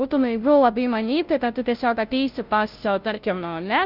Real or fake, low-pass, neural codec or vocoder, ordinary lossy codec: fake; 5.4 kHz; codec, 16 kHz, 0.5 kbps, FunCodec, trained on Chinese and English, 25 frames a second; Opus, 32 kbps